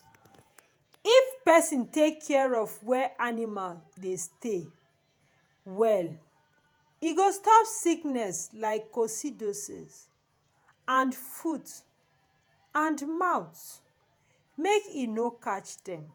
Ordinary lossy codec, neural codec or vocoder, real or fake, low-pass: none; vocoder, 48 kHz, 128 mel bands, Vocos; fake; none